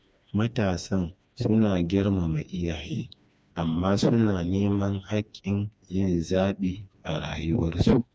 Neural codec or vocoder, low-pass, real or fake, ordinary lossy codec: codec, 16 kHz, 2 kbps, FreqCodec, smaller model; none; fake; none